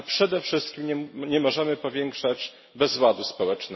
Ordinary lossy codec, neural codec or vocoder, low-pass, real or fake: MP3, 24 kbps; none; 7.2 kHz; real